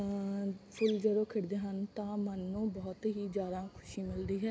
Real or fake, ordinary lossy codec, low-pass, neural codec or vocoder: real; none; none; none